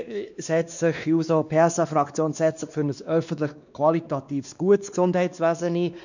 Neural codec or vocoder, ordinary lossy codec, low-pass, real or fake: codec, 16 kHz, 2 kbps, X-Codec, WavLM features, trained on Multilingual LibriSpeech; none; 7.2 kHz; fake